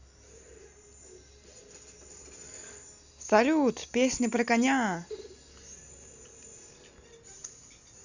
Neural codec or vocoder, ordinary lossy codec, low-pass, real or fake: none; Opus, 64 kbps; 7.2 kHz; real